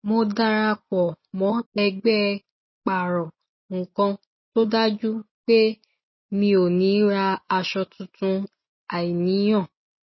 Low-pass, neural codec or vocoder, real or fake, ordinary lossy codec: 7.2 kHz; none; real; MP3, 24 kbps